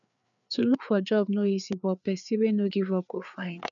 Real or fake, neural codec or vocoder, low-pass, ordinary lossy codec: fake; codec, 16 kHz, 4 kbps, FreqCodec, larger model; 7.2 kHz; none